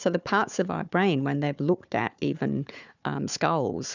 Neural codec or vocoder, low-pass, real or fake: codec, 16 kHz, 4 kbps, FunCodec, trained on Chinese and English, 50 frames a second; 7.2 kHz; fake